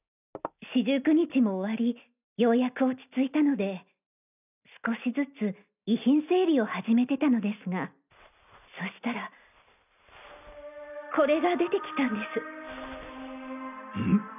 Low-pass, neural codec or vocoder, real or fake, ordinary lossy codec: 3.6 kHz; none; real; none